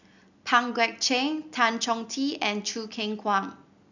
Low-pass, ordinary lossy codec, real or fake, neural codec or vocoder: 7.2 kHz; none; real; none